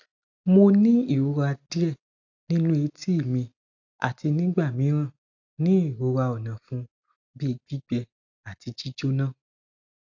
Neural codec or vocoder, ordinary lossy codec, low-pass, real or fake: none; AAC, 48 kbps; 7.2 kHz; real